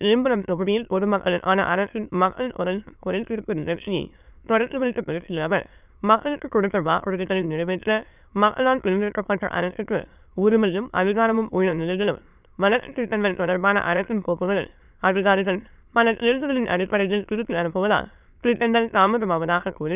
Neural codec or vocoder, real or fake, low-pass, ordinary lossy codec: autoencoder, 22.05 kHz, a latent of 192 numbers a frame, VITS, trained on many speakers; fake; 3.6 kHz; none